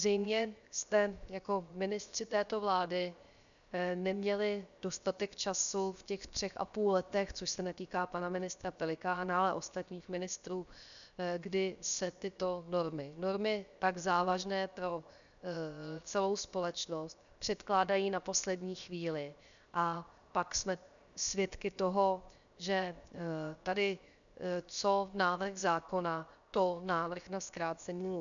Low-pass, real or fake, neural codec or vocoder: 7.2 kHz; fake; codec, 16 kHz, 0.7 kbps, FocalCodec